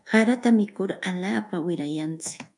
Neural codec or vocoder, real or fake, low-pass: codec, 24 kHz, 1.2 kbps, DualCodec; fake; 10.8 kHz